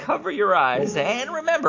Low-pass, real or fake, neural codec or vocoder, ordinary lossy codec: 7.2 kHz; real; none; AAC, 48 kbps